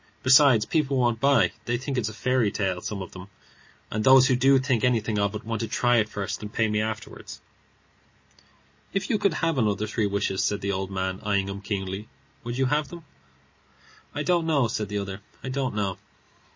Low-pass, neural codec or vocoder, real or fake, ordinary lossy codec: 7.2 kHz; none; real; MP3, 32 kbps